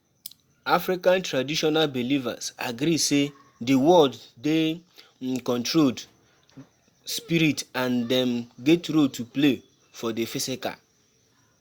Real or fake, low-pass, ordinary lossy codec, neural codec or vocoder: real; 19.8 kHz; Opus, 64 kbps; none